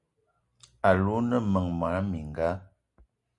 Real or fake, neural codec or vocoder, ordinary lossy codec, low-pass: real; none; Opus, 64 kbps; 10.8 kHz